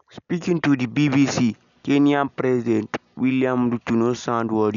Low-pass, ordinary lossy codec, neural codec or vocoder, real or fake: 7.2 kHz; none; none; real